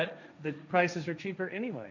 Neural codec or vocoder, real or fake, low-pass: codec, 16 kHz, 1.1 kbps, Voila-Tokenizer; fake; 7.2 kHz